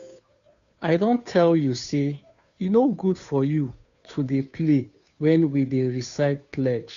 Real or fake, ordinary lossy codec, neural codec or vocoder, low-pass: fake; none; codec, 16 kHz, 2 kbps, FunCodec, trained on Chinese and English, 25 frames a second; 7.2 kHz